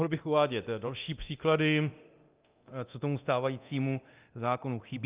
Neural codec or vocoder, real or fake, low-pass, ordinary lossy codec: codec, 24 kHz, 0.9 kbps, DualCodec; fake; 3.6 kHz; Opus, 24 kbps